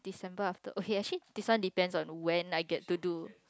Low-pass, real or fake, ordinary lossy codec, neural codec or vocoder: none; real; none; none